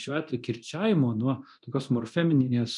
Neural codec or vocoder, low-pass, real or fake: codec, 24 kHz, 0.9 kbps, DualCodec; 10.8 kHz; fake